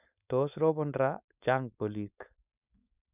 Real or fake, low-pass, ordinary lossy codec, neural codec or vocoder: fake; 3.6 kHz; none; codec, 16 kHz, 4.8 kbps, FACodec